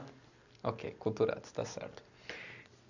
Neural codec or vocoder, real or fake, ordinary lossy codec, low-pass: none; real; none; 7.2 kHz